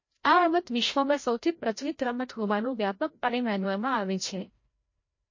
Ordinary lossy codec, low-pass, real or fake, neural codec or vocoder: MP3, 32 kbps; 7.2 kHz; fake; codec, 16 kHz, 0.5 kbps, FreqCodec, larger model